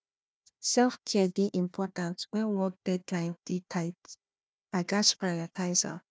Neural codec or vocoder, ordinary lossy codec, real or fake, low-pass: codec, 16 kHz, 1 kbps, FunCodec, trained on Chinese and English, 50 frames a second; none; fake; none